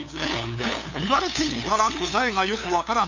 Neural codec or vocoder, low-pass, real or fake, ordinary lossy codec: codec, 16 kHz, 2 kbps, FunCodec, trained on LibriTTS, 25 frames a second; 7.2 kHz; fake; none